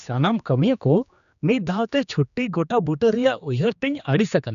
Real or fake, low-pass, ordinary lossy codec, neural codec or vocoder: fake; 7.2 kHz; none; codec, 16 kHz, 2 kbps, X-Codec, HuBERT features, trained on general audio